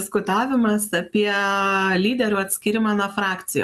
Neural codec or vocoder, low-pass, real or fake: none; 14.4 kHz; real